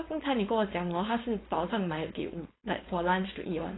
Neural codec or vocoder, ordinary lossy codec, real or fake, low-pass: codec, 16 kHz, 4.8 kbps, FACodec; AAC, 16 kbps; fake; 7.2 kHz